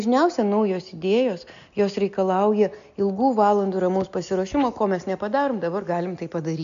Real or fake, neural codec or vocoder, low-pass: real; none; 7.2 kHz